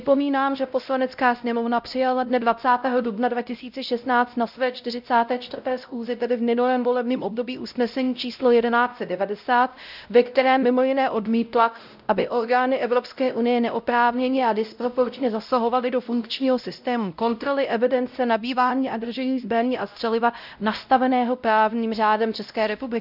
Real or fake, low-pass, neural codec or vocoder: fake; 5.4 kHz; codec, 16 kHz, 0.5 kbps, X-Codec, WavLM features, trained on Multilingual LibriSpeech